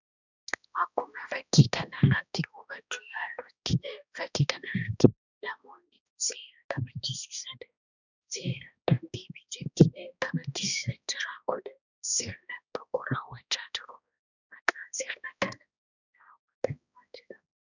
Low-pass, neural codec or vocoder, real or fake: 7.2 kHz; codec, 16 kHz, 1 kbps, X-Codec, HuBERT features, trained on balanced general audio; fake